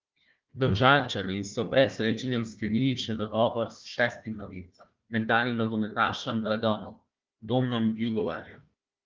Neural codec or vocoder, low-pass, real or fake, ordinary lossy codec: codec, 16 kHz, 1 kbps, FunCodec, trained on Chinese and English, 50 frames a second; 7.2 kHz; fake; Opus, 32 kbps